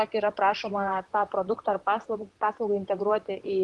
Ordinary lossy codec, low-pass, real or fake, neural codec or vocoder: Opus, 24 kbps; 10.8 kHz; fake; vocoder, 44.1 kHz, 128 mel bands, Pupu-Vocoder